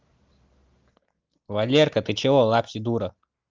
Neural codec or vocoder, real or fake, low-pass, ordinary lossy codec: none; real; 7.2 kHz; Opus, 24 kbps